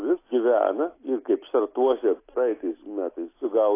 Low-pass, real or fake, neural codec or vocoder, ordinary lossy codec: 3.6 kHz; real; none; AAC, 24 kbps